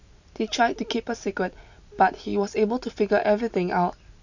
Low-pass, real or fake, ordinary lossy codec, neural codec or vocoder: 7.2 kHz; real; none; none